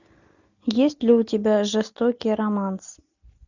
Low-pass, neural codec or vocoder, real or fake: 7.2 kHz; none; real